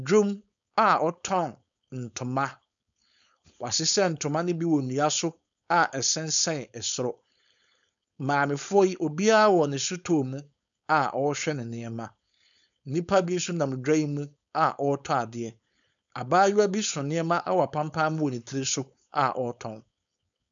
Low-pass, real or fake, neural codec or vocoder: 7.2 kHz; fake; codec, 16 kHz, 4.8 kbps, FACodec